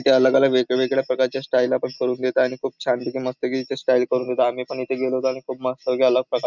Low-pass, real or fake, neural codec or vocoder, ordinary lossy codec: 7.2 kHz; real; none; none